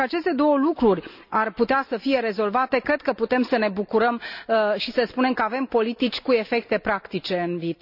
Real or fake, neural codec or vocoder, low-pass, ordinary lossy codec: real; none; 5.4 kHz; none